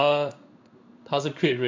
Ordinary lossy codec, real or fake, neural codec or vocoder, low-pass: MP3, 64 kbps; fake; codec, 16 kHz in and 24 kHz out, 1 kbps, XY-Tokenizer; 7.2 kHz